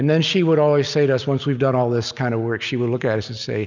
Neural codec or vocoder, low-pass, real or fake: none; 7.2 kHz; real